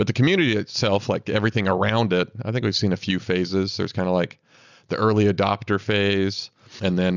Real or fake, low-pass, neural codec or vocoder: real; 7.2 kHz; none